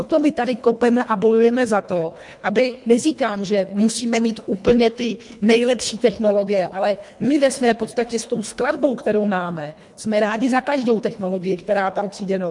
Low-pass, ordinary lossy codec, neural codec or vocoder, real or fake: 10.8 kHz; MP3, 64 kbps; codec, 24 kHz, 1.5 kbps, HILCodec; fake